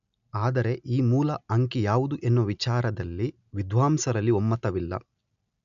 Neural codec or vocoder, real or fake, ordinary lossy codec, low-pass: none; real; none; 7.2 kHz